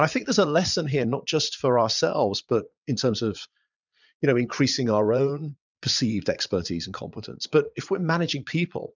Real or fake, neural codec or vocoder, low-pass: fake; vocoder, 22.05 kHz, 80 mel bands, Vocos; 7.2 kHz